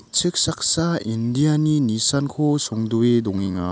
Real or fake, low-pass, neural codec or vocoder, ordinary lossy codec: real; none; none; none